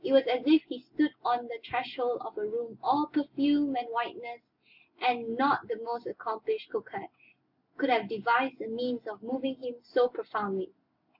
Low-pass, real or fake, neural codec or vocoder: 5.4 kHz; real; none